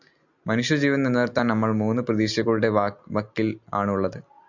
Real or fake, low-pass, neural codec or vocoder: real; 7.2 kHz; none